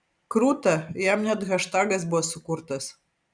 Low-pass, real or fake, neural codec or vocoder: 9.9 kHz; real; none